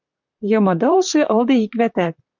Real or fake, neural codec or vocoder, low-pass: fake; vocoder, 44.1 kHz, 128 mel bands, Pupu-Vocoder; 7.2 kHz